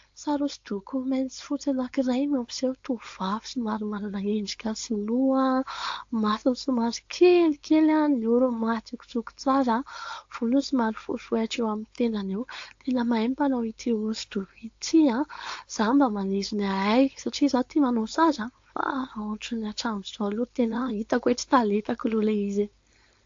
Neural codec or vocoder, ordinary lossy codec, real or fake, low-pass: codec, 16 kHz, 4.8 kbps, FACodec; AAC, 48 kbps; fake; 7.2 kHz